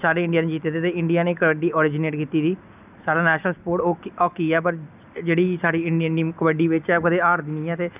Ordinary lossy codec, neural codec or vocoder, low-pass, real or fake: none; none; 3.6 kHz; real